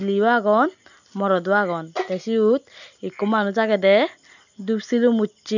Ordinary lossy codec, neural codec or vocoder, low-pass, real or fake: none; none; 7.2 kHz; real